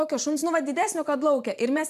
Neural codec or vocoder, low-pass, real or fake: vocoder, 44.1 kHz, 128 mel bands every 512 samples, BigVGAN v2; 14.4 kHz; fake